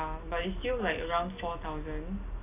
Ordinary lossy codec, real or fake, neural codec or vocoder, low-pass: AAC, 32 kbps; real; none; 3.6 kHz